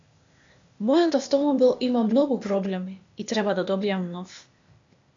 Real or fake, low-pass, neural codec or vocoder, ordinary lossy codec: fake; 7.2 kHz; codec, 16 kHz, 0.8 kbps, ZipCodec; AAC, 64 kbps